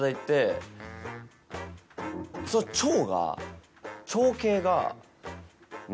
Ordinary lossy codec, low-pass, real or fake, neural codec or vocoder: none; none; real; none